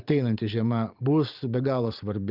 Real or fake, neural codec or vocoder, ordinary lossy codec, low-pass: fake; vocoder, 44.1 kHz, 128 mel bands every 512 samples, BigVGAN v2; Opus, 24 kbps; 5.4 kHz